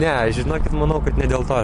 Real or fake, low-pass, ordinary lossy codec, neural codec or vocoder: real; 10.8 kHz; MP3, 48 kbps; none